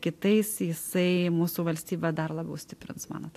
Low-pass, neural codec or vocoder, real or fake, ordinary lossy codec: 14.4 kHz; none; real; AAC, 64 kbps